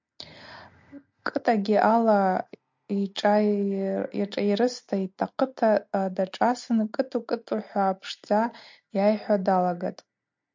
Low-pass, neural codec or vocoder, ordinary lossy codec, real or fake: 7.2 kHz; none; MP3, 64 kbps; real